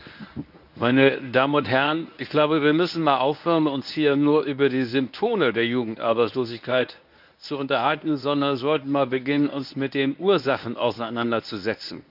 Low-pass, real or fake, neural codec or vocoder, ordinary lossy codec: 5.4 kHz; fake; codec, 24 kHz, 0.9 kbps, WavTokenizer, medium speech release version 1; none